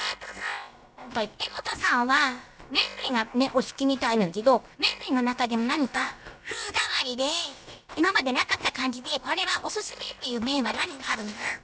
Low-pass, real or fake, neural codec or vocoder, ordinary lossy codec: none; fake; codec, 16 kHz, about 1 kbps, DyCAST, with the encoder's durations; none